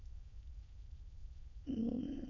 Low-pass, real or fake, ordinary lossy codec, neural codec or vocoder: 7.2 kHz; fake; MP3, 48 kbps; autoencoder, 22.05 kHz, a latent of 192 numbers a frame, VITS, trained on many speakers